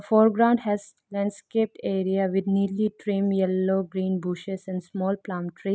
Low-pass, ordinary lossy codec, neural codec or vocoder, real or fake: none; none; none; real